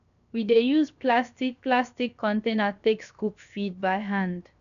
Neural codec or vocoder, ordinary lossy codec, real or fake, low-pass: codec, 16 kHz, 0.7 kbps, FocalCodec; none; fake; 7.2 kHz